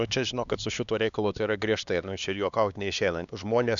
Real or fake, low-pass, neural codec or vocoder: fake; 7.2 kHz; codec, 16 kHz, 2 kbps, X-Codec, HuBERT features, trained on LibriSpeech